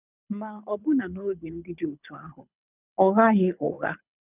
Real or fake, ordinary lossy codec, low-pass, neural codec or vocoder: fake; none; 3.6 kHz; codec, 24 kHz, 6 kbps, HILCodec